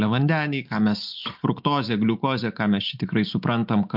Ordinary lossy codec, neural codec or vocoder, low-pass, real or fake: MP3, 48 kbps; none; 5.4 kHz; real